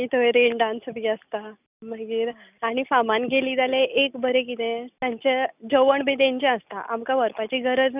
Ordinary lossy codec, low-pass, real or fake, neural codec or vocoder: none; 3.6 kHz; real; none